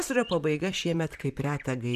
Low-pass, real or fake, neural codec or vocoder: 14.4 kHz; fake; vocoder, 44.1 kHz, 128 mel bands, Pupu-Vocoder